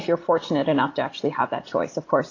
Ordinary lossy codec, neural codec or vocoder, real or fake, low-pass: AAC, 32 kbps; vocoder, 44.1 kHz, 128 mel bands every 256 samples, BigVGAN v2; fake; 7.2 kHz